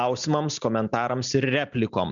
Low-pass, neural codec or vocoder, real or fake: 7.2 kHz; none; real